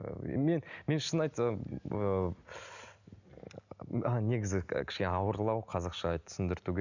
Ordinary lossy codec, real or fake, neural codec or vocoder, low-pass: none; real; none; 7.2 kHz